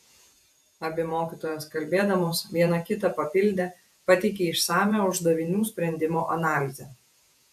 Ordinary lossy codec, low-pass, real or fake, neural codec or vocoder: AAC, 96 kbps; 14.4 kHz; fake; vocoder, 48 kHz, 128 mel bands, Vocos